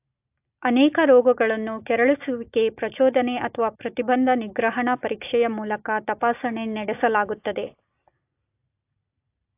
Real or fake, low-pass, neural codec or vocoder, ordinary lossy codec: real; 3.6 kHz; none; AAC, 32 kbps